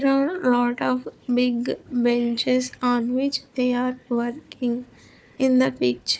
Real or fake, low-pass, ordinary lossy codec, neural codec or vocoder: fake; none; none; codec, 16 kHz, 4 kbps, FunCodec, trained on Chinese and English, 50 frames a second